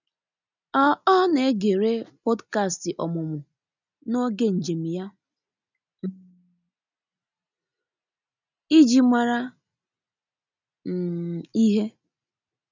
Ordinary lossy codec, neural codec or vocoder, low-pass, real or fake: none; none; 7.2 kHz; real